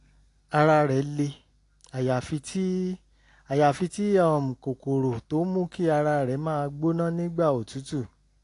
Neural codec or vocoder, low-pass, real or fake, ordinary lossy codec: none; 10.8 kHz; real; AAC, 48 kbps